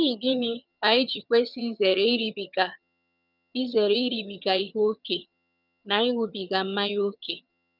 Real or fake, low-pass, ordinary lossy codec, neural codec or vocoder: fake; 5.4 kHz; none; vocoder, 22.05 kHz, 80 mel bands, HiFi-GAN